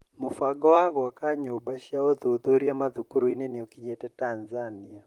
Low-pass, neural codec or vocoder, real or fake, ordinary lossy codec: 19.8 kHz; vocoder, 44.1 kHz, 128 mel bands, Pupu-Vocoder; fake; Opus, 32 kbps